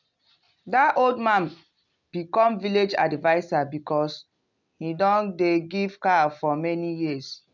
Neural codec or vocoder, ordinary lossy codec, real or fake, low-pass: none; none; real; 7.2 kHz